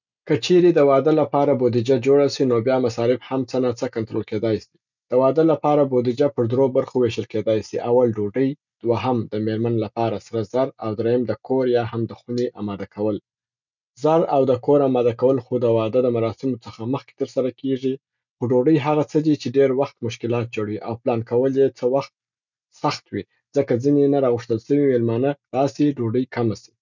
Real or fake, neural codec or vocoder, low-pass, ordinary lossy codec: real; none; 7.2 kHz; none